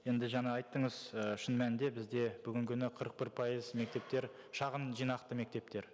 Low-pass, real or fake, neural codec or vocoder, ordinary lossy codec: none; real; none; none